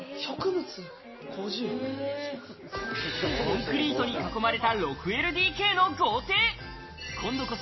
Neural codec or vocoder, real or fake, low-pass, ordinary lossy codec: none; real; 7.2 kHz; MP3, 24 kbps